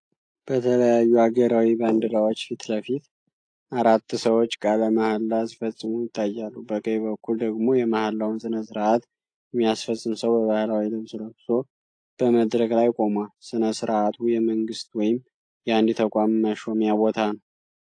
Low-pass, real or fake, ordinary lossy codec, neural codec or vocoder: 9.9 kHz; real; AAC, 48 kbps; none